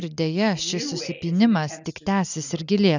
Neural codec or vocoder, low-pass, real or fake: none; 7.2 kHz; real